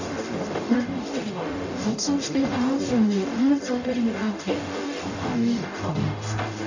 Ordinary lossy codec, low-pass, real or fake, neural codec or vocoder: none; 7.2 kHz; fake; codec, 44.1 kHz, 0.9 kbps, DAC